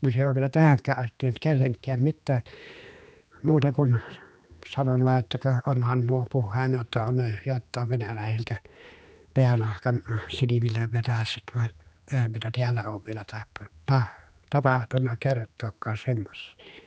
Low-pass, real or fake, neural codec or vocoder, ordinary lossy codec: none; fake; codec, 16 kHz, 2 kbps, X-Codec, HuBERT features, trained on general audio; none